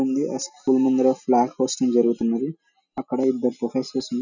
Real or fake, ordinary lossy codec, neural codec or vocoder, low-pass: real; none; none; 7.2 kHz